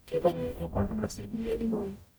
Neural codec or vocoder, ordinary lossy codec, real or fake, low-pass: codec, 44.1 kHz, 0.9 kbps, DAC; none; fake; none